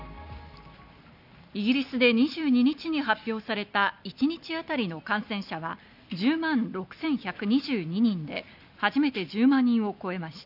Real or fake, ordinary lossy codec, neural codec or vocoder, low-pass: real; none; none; 5.4 kHz